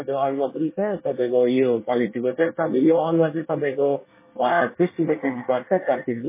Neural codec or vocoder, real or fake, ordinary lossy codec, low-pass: codec, 24 kHz, 1 kbps, SNAC; fake; MP3, 16 kbps; 3.6 kHz